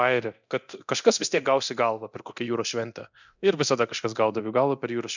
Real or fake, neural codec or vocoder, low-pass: fake; codec, 24 kHz, 0.9 kbps, DualCodec; 7.2 kHz